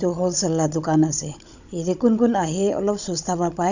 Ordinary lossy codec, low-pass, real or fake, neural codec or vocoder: none; 7.2 kHz; fake; codec, 16 kHz, 16 kbps, FunCodec, trained on Chinese and English, 50 frames a second